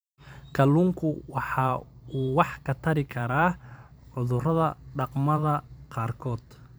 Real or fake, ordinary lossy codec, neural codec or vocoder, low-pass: fake; none; vocoder, 44.1 kHz, 128 mel bands every 512 samples, BigVGAN v2; none